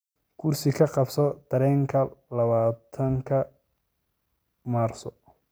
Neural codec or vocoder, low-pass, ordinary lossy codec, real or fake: none; none; none; real